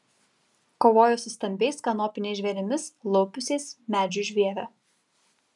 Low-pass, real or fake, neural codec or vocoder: 10.8 kHz; real; none